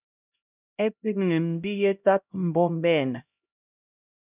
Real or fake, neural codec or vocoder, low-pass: fake; codec, 16 kHz, 0.5 kbps, X-Codec, HuBERT features, trained on LibriSpeech; 3.6 kHz